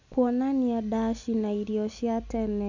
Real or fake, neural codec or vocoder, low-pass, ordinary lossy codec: real; none; 7.2 kHz; none